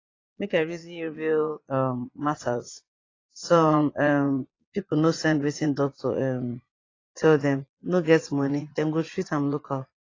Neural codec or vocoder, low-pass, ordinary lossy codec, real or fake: vocoder, 22.05 kHz, 80 mel bands, Vocos; 7.2 kHz; AAC, 32 kbps; fake